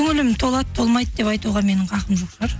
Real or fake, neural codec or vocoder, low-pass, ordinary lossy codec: real; none; none; none